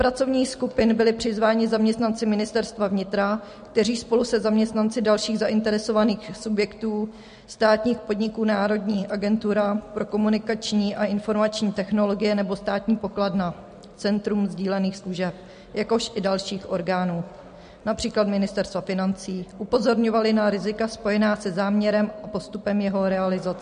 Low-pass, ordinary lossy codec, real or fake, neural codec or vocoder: 9.9 kHz; MP3, 48 kbps; real; none